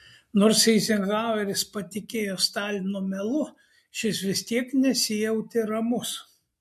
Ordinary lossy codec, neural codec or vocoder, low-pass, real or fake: MP3, 64 kbps; none; 14.4 kHz; real